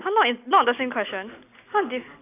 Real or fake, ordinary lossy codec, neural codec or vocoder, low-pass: real; none; none; 3.6 kHz